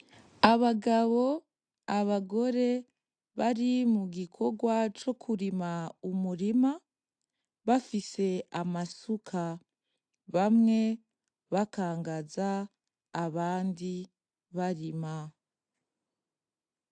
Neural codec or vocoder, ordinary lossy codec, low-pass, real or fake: none; AAC, 64 kbps; 9.9 kHz; real